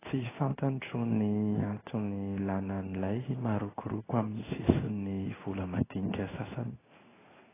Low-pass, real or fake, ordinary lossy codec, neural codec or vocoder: 3.6 kHz; fake; AAC, 16 kbps; codec, 16 kHz in and 24 kHz out, 1 kbps, XY-Tokenizer